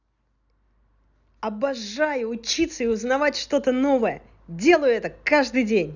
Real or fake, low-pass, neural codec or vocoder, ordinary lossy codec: real; 7.2 kHz; none; none